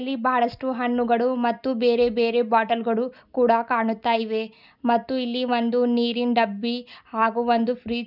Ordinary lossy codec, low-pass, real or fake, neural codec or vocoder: none; 5.4 kHz; real; none